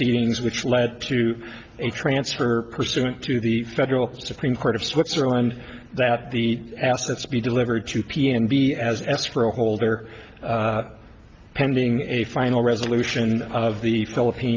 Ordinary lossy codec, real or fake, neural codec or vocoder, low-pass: Opus, 32 kbps; real; none; 7.2 kHz